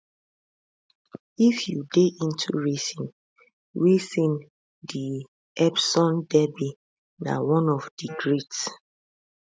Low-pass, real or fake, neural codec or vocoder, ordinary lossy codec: none; real; none; none